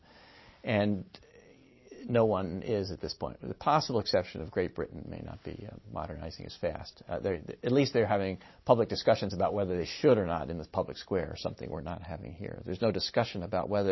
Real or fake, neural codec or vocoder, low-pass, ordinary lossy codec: real; none; 7.2 kHz; MP3, 24 kbps